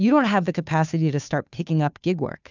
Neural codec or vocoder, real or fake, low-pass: codec, 16 kHz, 2 kbps, FunCodec, trained on Chinese and English, 25 frames a second; fake; 7.2 kHz